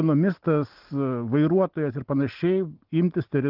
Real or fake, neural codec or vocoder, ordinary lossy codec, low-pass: real; none; Opus, 16 kbps; 5.4 kHz